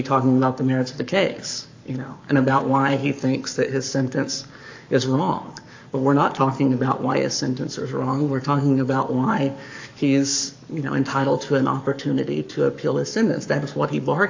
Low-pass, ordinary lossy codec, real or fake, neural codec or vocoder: 7.2 kHz; AAC, 48 kbps; fake; codec, 44.1 kHz, 7.8 kbps, Pupu-Codec